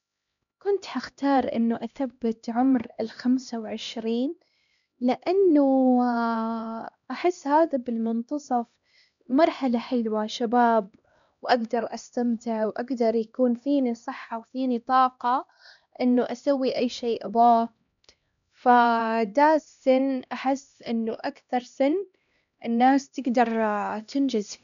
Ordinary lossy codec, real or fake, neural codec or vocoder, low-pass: none; fake; codec, 16 kHz, 1 kbps, X-Codec, HuBERT features, trained on LibriSpeech; 7.2 kHz